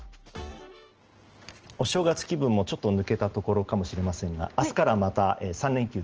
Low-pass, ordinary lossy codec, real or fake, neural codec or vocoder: 7.2 kHz; Opus, 16 kbps; real; none